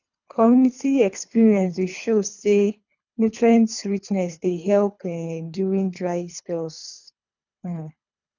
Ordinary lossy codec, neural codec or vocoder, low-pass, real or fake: none; codec, 24 kHz, 3 kbps, HILCodec; 7.2 kHz; fake